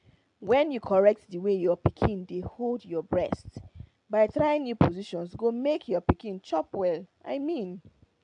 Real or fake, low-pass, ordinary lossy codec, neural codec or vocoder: real; 9.9 kHz; AAC, 64 kbps; none